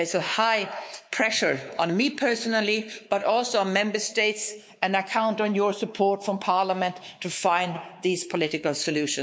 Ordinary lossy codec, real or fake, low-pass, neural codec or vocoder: none; fake; none; codec, 16 kHz, 4 kbps, X-Codec, WavLM features, trained on Multilingual LibriSpeech